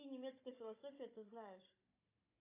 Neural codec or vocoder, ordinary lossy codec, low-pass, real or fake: codec, 16 kHz, 16 kbps, FunCodec, trained on Chinese and English, 50 frames a second; AAC, 32 kbps; 3.6 kHz; fake